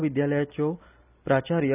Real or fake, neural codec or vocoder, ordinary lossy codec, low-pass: real; none; none; 3.6 kHz